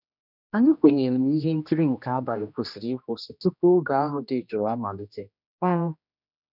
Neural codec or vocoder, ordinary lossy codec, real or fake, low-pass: codec, 16 kHz, 1 kbps, X-Codec, HuBERT features, trained on general audio; none; fake; 5.4 kHz